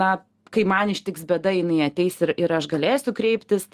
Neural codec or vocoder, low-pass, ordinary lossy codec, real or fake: none; 14.4 kHz; Opus, 24 kbps; real